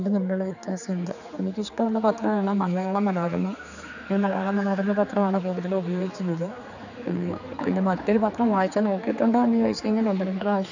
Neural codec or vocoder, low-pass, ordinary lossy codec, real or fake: codec, 16 kHz, 4 kbps, X-Codec, HuBERT features, trained on general audio; 7.2 kHz; none; fake